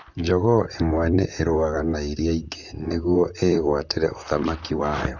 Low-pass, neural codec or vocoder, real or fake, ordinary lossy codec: 7.2 kHz; vocoder, 44.1 kHz, 128 mel bands, Pupu-Vocoder; fake; none